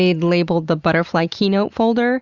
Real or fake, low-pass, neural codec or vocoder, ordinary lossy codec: real; 7.2 kHz; none; Opus, 64 kbps